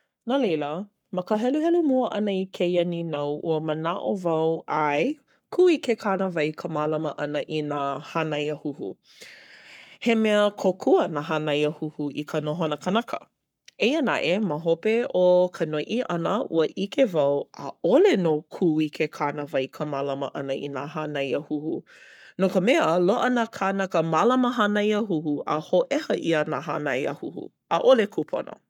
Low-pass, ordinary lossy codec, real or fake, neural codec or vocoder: 19.8 kHz; none; fake; codec, 44.1 kHz, 7.8 kbps, Pupu-Codec